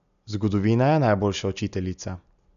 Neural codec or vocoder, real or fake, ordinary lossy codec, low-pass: none; real; none; 7.2 kHz